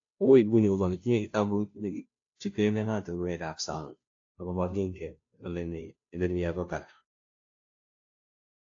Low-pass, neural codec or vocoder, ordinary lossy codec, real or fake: 7.2 kHz; codec, 16 kHz, 0.5 kbps, FunCodec, trained on Chinese and English, 25 frames a second; AAC, 48 kbps; fake